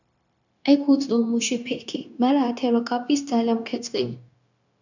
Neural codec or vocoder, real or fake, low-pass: codec, 16 kHz, 0.9 kbps, LongCat-Audio-Codec; fake; 7.2 kHz